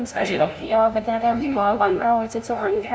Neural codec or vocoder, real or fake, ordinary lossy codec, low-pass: codec, 16 kHz, 0.5 kbps, FunCodec, trained on LibriTTS, 25 frames a second; fake; none; none